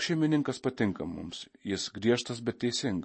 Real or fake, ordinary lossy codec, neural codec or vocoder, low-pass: real; MP3, 32 kbps; none; 9.9 kHz